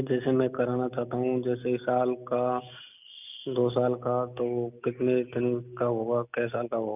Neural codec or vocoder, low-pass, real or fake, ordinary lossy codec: none; 3.6 kHz; real; none